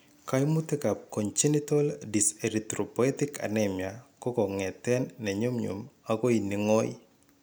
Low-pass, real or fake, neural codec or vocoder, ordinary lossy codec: none; real; none; none